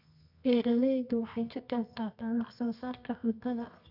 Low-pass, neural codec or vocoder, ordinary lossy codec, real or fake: 5.4 kHz; codec, 24 kHz, 0.9 kbps, WavTokenizer, medium music audio release; none; fake